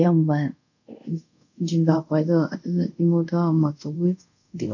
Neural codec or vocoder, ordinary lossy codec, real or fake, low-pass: codec, 24 kHz, 0.5 kbps, DualCodec; none; fake; 7.2 kHz